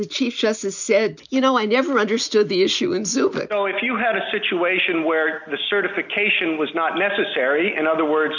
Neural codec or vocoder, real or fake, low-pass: none; real; 7.2 kHz